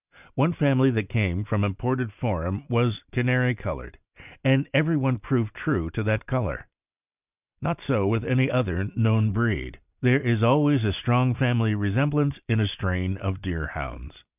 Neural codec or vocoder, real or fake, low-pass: none; real; 3.6 kHz